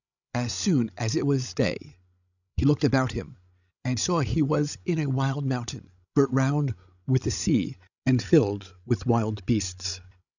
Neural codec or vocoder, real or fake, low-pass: codec, 16 kHz, 16 kbps, FreqCodec, larger model; fake; 7.2 kHz